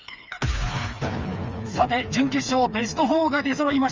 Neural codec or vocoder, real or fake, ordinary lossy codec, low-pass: codec, 16 kHz, 4 kbps, FreqCodec, smaller model; fake; Opus, 32 kbps; 7.2 kHz